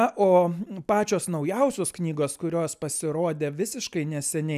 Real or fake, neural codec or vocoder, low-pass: real; none; 14.4 kHz